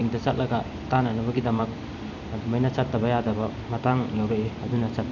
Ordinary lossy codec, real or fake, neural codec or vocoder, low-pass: none; fake; vocoder, 44.1 kHz, 128 mel bands every 256 samples, BigVGAN v2; 7.2 kHz